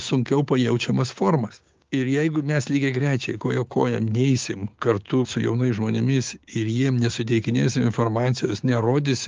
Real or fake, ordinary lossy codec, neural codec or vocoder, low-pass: fake; Opus, 32 kbps; codec, 16 kHz, 6 kbps, DAC; 7.2 kHz